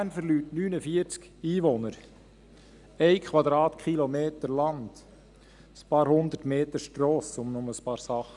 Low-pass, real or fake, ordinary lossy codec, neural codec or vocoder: 10.8 kHz; real; none; none